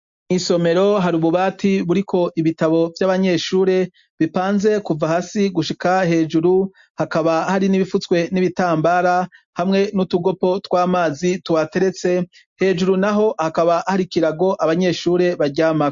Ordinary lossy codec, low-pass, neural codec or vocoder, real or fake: MP3, 48 kbps; 7.2 kHz; none; real